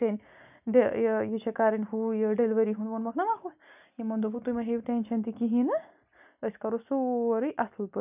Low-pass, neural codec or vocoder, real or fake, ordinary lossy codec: 3.6 kHz; none; real; none